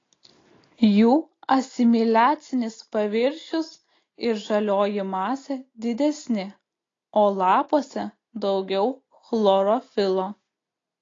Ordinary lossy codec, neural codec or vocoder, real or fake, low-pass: AAC, 32 kbps; none; real; 7.2 kHz